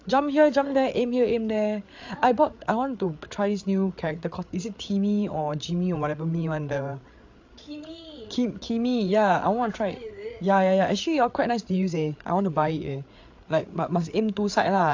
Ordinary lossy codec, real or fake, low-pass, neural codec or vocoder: AAC, 48 kbps; fake; 7.2 kHz; codec, 16 kHz, 8 kbps, FreqCodec, larger model